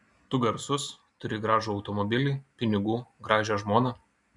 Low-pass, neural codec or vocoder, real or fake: 10.8 kHz; none; real